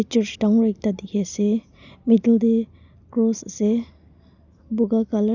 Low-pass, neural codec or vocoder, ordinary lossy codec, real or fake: 7.2 kHz; none; none; real